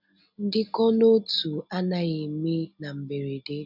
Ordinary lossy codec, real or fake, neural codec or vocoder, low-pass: none; real; none; 5.4 kHz